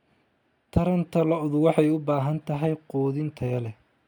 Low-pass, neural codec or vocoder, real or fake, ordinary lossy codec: 19.8 kHz; none; real; MP3, 96 kbps